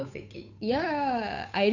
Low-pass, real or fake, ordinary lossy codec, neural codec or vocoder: 7.2 kHz; fake; none; codec, 16 kHz, 8 kbps, FunCodec, trained on Chinese and English, 25 frames a second